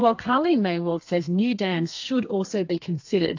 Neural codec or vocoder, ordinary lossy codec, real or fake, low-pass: codec, 32 kHz, 1.9 kbps, SNAC; AAC, 48 kbps; fake; 7.2 kHz